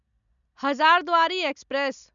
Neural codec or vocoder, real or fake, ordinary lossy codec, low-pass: none; real; none; 7.2 kHz